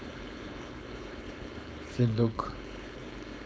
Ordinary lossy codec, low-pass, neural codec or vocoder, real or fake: none; none; codec, 16 kHz, 4.8 kbps, FACodec; fake